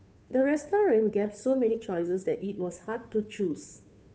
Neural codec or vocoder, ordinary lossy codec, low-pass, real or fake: codec, 16 kHz, 2 kbps, FunCodec, trained on Chinese and English, 25 frames a second; none; none; fake